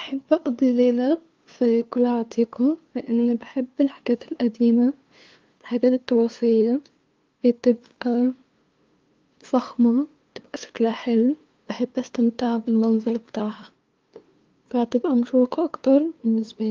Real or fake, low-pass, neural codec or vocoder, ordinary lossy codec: fake; 7.2 kHz; codec, 16 kHz, 2 kbps, FunCodec, trained on LibriTTS, 25 frames a second; Opus, 32 kbps